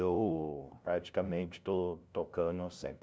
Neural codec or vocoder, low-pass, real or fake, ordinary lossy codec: codec, 16 kHz, 0.5 kbps, FunCodec, trained on LibriTTS, 25 frames a second; none; fake; none